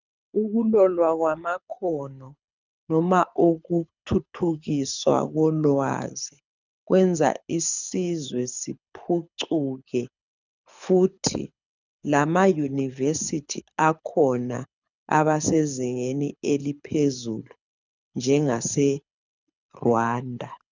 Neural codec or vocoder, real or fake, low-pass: codec, 24 kHz, 6 kbps, HILCodec; fake; 7.2 kHz